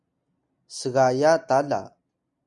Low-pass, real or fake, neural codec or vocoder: 10.8 kHz; real; none